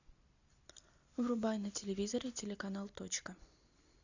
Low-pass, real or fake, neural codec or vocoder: 7.2 kHz; real; none